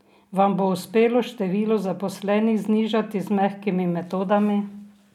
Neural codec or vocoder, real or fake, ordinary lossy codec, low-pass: none; real; none; 19.8 kHz